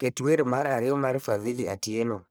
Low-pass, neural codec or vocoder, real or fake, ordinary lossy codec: none; codec, 44.1 kHz, 1.7 kbps, Pupu-Codec; fake; none